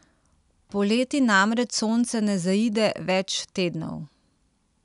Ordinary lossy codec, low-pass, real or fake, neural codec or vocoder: none; 10.8 kHz; real; none